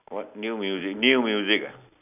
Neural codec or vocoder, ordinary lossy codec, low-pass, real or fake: none; none; 3.6 kHz; real